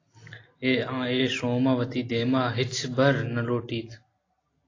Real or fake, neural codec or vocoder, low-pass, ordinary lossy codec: real; none; 7.2 kHz; AAC, 32 kbps